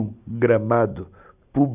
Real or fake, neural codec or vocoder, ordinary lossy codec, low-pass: real; none; none; 3.6 kHz